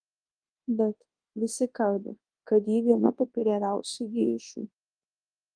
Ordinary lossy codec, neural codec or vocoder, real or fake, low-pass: Opus, 24 kbps; codec, 24 kHz, 0.9 kbps, WavTokenizer, large speech release; fake; 9.9 kHz